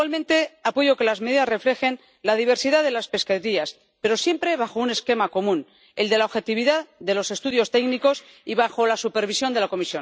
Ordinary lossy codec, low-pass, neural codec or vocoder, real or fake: none; none; none; real